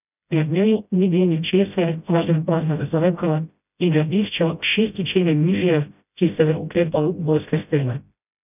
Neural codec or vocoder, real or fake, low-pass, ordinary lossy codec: codec, 16 kHz, 0.5 kbps, FreqCodec, smaller model; fake; 3.6 kHz; none